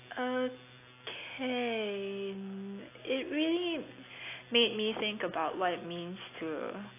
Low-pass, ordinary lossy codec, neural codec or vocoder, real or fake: 3.6 kHz; AAC, 24 kbps; none; real